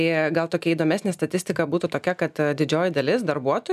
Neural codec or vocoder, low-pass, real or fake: none; 14.4 kHz; real